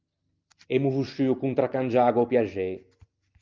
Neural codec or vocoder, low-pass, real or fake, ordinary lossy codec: none; 7.2 kHz; real; Opus, 24 kbps